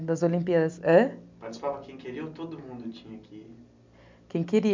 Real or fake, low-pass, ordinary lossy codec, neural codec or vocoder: real; 7.2 kHz; MP3, 64 kbps; none